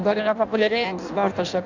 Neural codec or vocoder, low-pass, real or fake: codec, 16 kHz in and 24 kHz out, 0.6 kbps, FireRedTTS-2 codec; 7.2 kHz; fake